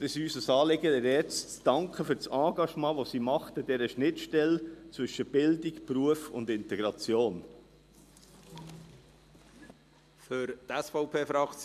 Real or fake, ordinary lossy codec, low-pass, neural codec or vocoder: real; MP3, 96 kbps; 14.4 kHz; none